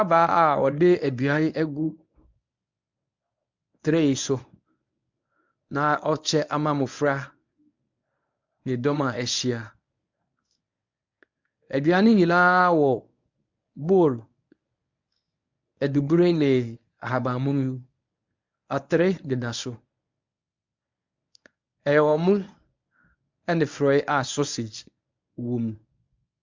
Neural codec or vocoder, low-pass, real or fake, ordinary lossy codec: codec, 24 kHz, 0.9 kbps, WavTokenizer, medium speech release version 1; 7.2 kHz; fake; MP3, 64 kbps